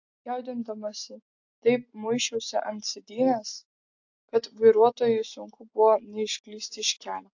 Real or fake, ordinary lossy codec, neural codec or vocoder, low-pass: real; AAC, 48 kbps; none; 7.2 kHz